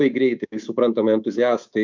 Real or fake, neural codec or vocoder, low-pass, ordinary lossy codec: fake; codec, 24 kHz, 3.1 kbps, DualCodec; 7.2 kHz; MP3, 64 kbps